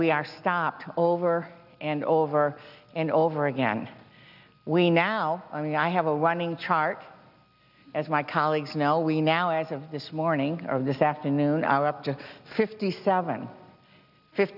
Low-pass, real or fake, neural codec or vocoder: 5.4 kHz; real; none